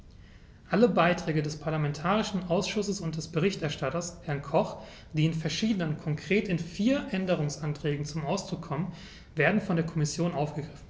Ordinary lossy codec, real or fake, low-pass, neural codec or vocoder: none; real; none; none